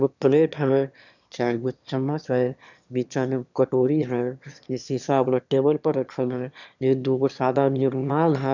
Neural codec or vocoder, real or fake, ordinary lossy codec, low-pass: autoencoder, 22.05 kHz, a latent of 192 numbers a frame, VITS, trained on one speaker; fake; none; 7.2 kHz